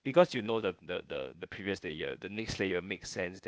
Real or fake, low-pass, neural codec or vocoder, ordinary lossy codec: fake; none; codec, 16 kHz, 0.8 kbps, ZipCodec; none